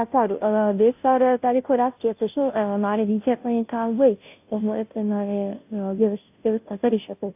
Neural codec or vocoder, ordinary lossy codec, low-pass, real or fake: codec, 16 kHz, 0.5 kbps, FunCodec, trained on Chinese and English, 25 frames a second; AAC, 32 kbps; 3.6 kHz; fake